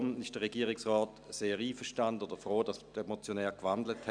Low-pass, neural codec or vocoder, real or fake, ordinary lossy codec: 9.9 kHz; none; real; none